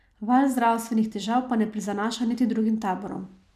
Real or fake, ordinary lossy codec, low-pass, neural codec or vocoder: real; none; 14.4 kHz; none